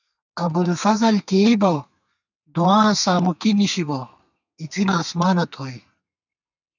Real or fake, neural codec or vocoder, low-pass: fake; codec, 32 kHz, 1.9 kbps, SNAC; 7.2 kHz